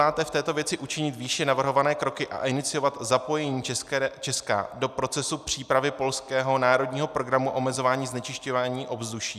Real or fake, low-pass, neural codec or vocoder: real; 14.4 kHz; none